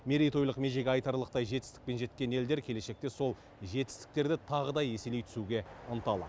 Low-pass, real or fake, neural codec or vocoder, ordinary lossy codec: none; real; none; none